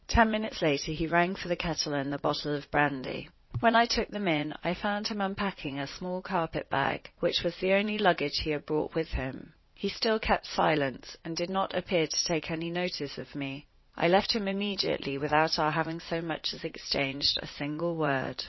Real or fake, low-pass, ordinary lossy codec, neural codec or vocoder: fake; 7.2 kHz; MP3, 24 kbps; vocoder, 44.1 kHz, 80 mel bands, Vocos